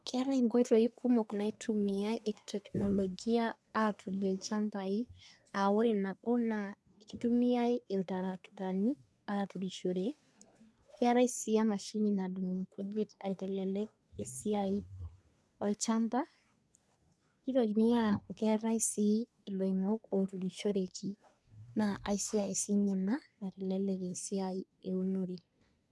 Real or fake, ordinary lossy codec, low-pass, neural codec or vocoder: fake; none; none; codec, 24 kHz, 1 kbps, SNAC